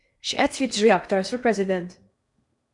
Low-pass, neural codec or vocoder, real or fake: 10.8 kHz; codec, 16 kHz in and 24 kHz out, 0.8 kbps, FocalCodec, streaming, 65536 codes; fake